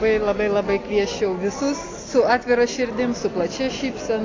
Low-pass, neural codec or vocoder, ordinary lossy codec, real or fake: 7.2 kHz; none; AAC, 32 kbps; real